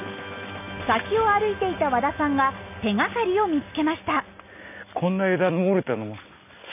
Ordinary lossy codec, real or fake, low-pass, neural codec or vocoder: none; real; 3.6 kHz; none